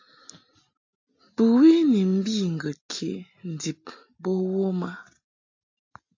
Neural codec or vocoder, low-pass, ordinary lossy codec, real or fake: none; 7.2 kHz; AAC, 48 kbps; real